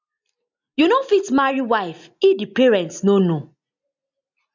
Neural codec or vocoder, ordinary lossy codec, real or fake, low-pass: none; MP3, 64 kbps; real; 7.2 kHz